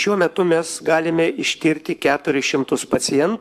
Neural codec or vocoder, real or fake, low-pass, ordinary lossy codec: codec, 44.1 kHz, 7.8 kbps, Pupu-Codec; fake; 14.4 kHz; MP3, 96 kbps